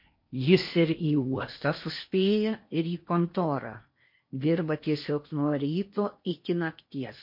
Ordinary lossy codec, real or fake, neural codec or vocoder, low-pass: MP3, 32 kbps; fake; codec, 16 kHz in and 24 kHz out, 0.8 kbps, FocalCodec, streaming, 65536 codes; 5.4 kHz